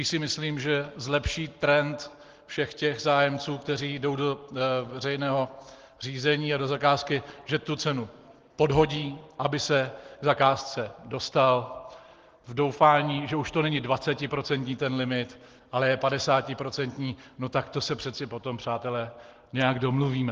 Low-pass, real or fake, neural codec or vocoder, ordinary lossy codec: 7.2 kHz; real; none; Opus, 16 kbps